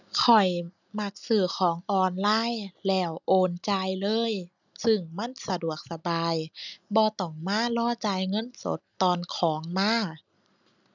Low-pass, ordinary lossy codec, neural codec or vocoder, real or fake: 7.2 kHz; none; none; real